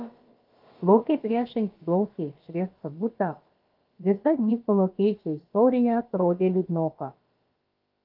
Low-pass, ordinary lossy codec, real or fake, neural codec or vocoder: 5.4 kHz; Opus, 32 kbps; fake; codec, 16 kHz, about 1 kbps, DyCAST, with the encoder's durations